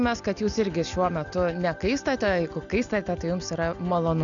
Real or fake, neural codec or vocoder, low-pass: real; none; 7.2 kHz